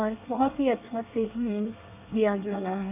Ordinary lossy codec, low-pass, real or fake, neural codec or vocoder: none; 3.6 kHz; fake; codec, 24 kHz, 1 kbps, SNAC